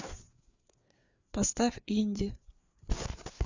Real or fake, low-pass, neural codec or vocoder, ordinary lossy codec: fake; 7.2 kHz; codec, 16 kHz, 4 kbps, FreqCodec, larger model; Opus, 64 kbps